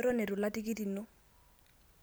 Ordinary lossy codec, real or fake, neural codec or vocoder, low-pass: none; real; none; none